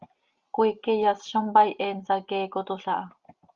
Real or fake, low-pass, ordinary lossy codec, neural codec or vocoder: real; 7.2 kHz; Opus, 32 kbps; none